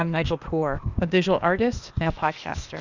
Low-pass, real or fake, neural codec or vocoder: 7.2 kHz; fake; codec, 16 kHz, 0.8 kbps, ZipCodec